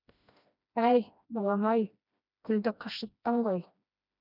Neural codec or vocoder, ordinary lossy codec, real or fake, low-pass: codec, 16 kHz, 1 kbps, FreqCodec, smaller model; none; fake; 5.4 kHz